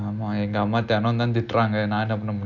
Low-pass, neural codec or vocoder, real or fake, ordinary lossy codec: 7.2 kHz; none; real; none